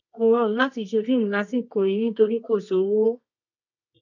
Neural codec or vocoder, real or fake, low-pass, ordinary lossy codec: codec, 24 kHz, 0.9 kbps, WavTokenizer, medium music audio release; fake; 7.2 kHz; none